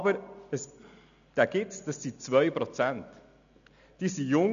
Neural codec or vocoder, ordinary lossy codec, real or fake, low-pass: none; none; real; 7.2 kHz